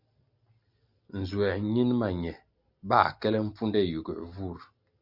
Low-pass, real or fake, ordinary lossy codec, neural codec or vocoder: 5.4 kHz; real; Opus, 64 kbps; none